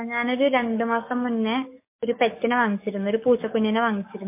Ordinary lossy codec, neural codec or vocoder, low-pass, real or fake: MP3, 24 kbps; codec, 44.1 kHz, 7.8 kbps, DAC; 3.6 kHz; fake